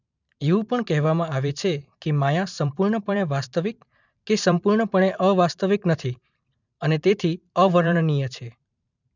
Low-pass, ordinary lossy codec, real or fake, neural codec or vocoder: 7.2 kHz; none; fake; vocoder, 44.1 kHz, 128 mel bands every 512 samples, BigVGAN v2